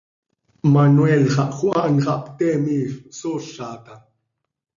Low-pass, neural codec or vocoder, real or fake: 7.2 kHz; none; real